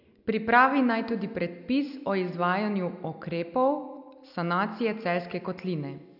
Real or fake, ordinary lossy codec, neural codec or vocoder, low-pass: real; none; none; 5.4 kHz